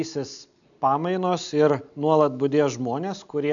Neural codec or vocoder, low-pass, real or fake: none; 7.2 kHz; real